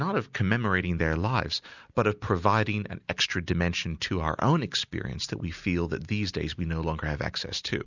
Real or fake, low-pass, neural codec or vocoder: real; 7.2 kHz; none